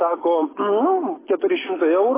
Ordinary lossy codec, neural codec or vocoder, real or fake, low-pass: AAC, 16 kbps; none; real; 3.6 kHz